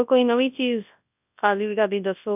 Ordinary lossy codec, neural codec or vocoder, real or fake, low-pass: none; codec, 24 kHz, 0.9 kbps, WavTokenizer, large speech release; fake; 3.6 kHz